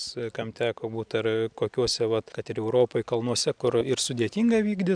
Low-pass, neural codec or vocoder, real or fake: 9.9 kHz; none; real